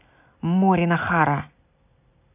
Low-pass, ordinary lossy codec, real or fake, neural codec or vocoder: 3.6 kHz; none; real; none